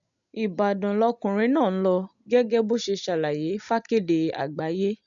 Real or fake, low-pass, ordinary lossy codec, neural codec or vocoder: real; 7.2 kHz; none; none